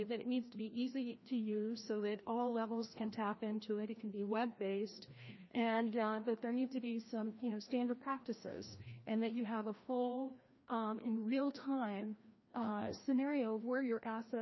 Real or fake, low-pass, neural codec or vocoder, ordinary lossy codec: fake; 7.2 kHz; codec, 16 kHz, 1 kbps, FreqCodec, larger model; MP3, 24 kbps